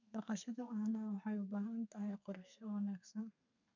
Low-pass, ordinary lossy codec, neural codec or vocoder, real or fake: 7.2 kHz; none; codec, 32 kHz, 1.9 kbps, SNAC; fake